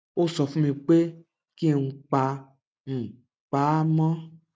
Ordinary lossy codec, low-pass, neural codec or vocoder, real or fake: none; none; none; real